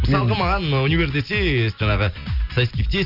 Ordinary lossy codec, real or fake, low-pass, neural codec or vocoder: none; real; 5.4 kHz; none